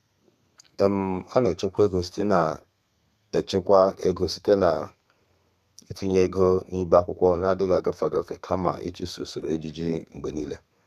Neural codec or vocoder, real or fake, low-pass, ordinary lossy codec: codec, 32 kHz, 1.9 kbps, SNAC; fake; 14.4 kHz; none